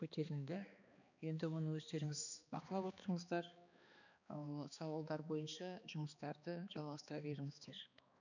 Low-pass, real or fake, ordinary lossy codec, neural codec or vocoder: 7.2 kHz; fake; none; codec, 16 kHz, 2 kbps, X-Codec, HuBERT features, trained on balanced general audio